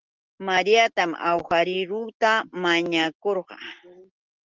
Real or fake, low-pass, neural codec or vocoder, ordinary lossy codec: real; 7.2 kHz; none; Opus, 16 kbps